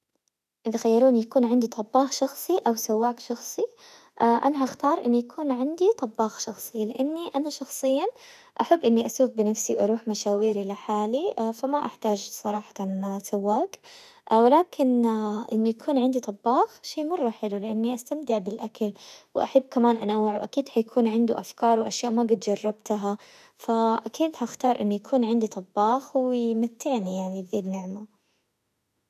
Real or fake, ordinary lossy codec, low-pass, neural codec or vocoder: fake; none; 14.4 kHz; autoencoder, 48 kHz, 32 numbers a frame, DAC-VAE, trained on Japanese speech